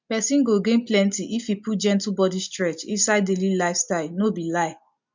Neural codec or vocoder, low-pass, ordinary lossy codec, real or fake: none; 7.2 kHz; MP3, 64 kbps; real